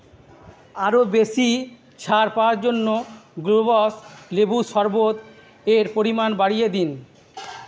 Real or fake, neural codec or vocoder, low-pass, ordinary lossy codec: real; none; none; none